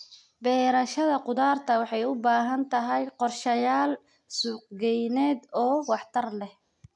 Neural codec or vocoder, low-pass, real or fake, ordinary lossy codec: none; 10.8 kHz; real; none